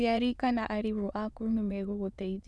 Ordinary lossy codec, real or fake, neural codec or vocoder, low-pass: none; fake; autoencoder, 22.05 kHz, a latent of 192 numbers a frame, VITS, trained on many speakers; none